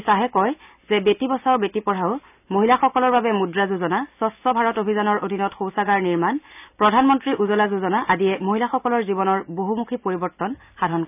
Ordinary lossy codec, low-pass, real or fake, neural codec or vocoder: none; 3.6 kHz; real; none